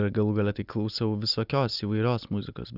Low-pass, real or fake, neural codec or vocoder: 5.4 kHz; real; none